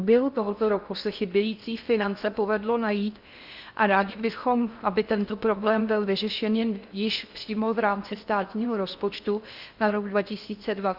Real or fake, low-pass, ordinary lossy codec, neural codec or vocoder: fake; 5.4 kHz; Opus, 64 kbps; codec, 16 kHz in and 24 kHz out, 0.6 kbps, FocalCodec, streaming, 4096 codes